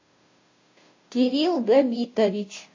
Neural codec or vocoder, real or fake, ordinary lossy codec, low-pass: codec, 16 kHz, 0.5 kbps, FunCodec, trained on Chinese and English, 25 frames a second; fake; MP3, 32 kbps; 7.2 kHz